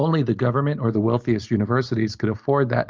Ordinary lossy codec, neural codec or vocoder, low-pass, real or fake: Opus, 24 kbps; none; 7.2 kHz; real